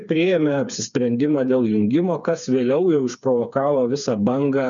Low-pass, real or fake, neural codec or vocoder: 7.2 kHz; fake; codec, 16 kHz, 4 kbps, FreqCodec, smaller model